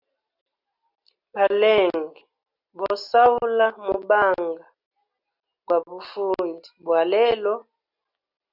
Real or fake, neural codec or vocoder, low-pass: real; none; 5.4 kHz